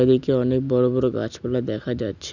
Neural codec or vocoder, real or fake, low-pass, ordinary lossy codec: none; real; 7.2 kHz; none